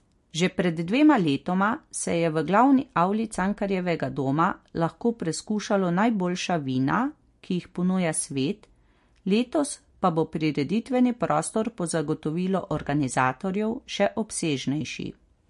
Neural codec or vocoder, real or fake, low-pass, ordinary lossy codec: none; real; 14.4 kHz; MP3, 48 kbps